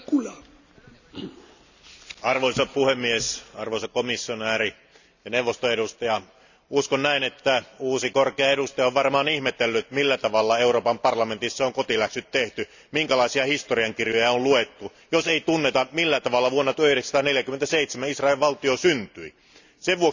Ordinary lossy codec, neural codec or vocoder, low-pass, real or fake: none; none; 7.2 kHz; real